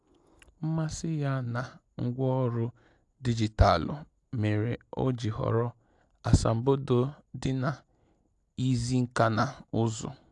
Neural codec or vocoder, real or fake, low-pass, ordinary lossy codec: none; real; 10.8 kHz; AAC, 64 kbps